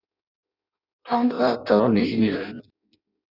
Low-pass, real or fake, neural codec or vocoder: 5.4 kHz; fake; codec, 16 kHz in and 24 kHz out, 0.6 kbps, FireRedTTS-2 codec